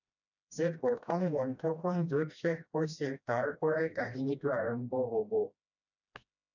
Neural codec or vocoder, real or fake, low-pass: codec, 16 kHz, 1 kbps, FreqCodec, smaller model; fake; 7.2 kHz